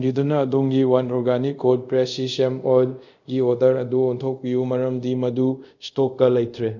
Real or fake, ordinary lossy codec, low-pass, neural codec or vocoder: fake; none; 7.2 kHz; codec, 24 kHz, 0.5 kbps, DualCodec